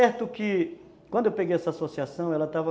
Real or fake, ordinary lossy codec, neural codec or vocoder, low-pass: real; none; none; none